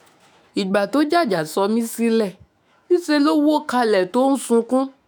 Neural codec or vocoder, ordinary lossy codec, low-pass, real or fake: autoencoder, 48 kHz, 128 numbers a frame, DAC-VAE, trained on Japanese speech; none; none; fake